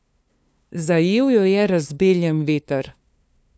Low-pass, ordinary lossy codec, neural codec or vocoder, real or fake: none; none; codec, 16 kHz, 2 kbps, FunCodec, trained on LibriTTS, 25 frames a second; fake